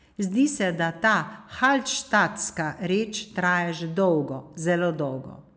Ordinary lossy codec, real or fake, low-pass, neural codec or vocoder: none; real; none; none